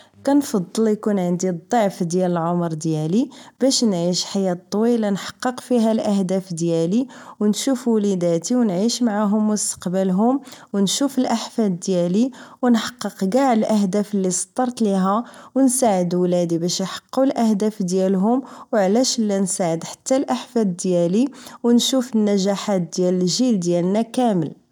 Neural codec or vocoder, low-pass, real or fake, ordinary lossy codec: none; 19.8 kHz; real; none